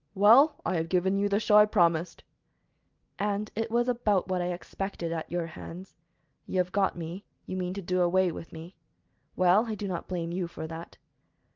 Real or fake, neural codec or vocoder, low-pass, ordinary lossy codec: real; none; 7.2 kHz; Opus, 32 kbps